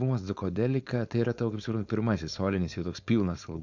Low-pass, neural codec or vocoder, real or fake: 7.2 kHz; codec, 16 kHz, 4.8 kbps, FACodec; fake